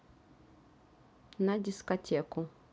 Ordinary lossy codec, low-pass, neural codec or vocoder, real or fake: none; none; none; real